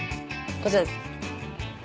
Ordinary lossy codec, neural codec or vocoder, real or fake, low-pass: none; none; real; none